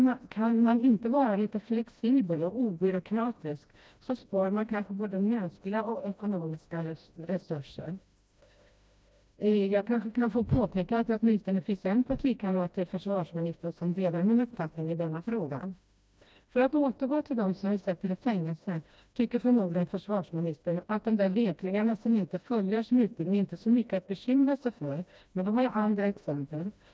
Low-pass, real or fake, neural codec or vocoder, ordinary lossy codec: none; fake; codec, 16 kHz, 1 kbps, FreqCodec, smaller model; none